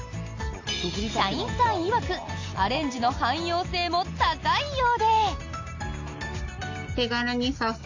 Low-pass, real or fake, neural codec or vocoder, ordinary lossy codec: 7.2 kHz; real; none; AAC, 48 kbps